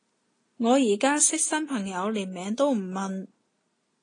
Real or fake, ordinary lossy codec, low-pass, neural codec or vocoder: real; AAC, 32 kbps; 9.9 kHz; none